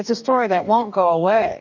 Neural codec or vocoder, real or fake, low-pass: codec, 44.1 kHz, 2.6 kbps, DAC; fake; 7.2 kHz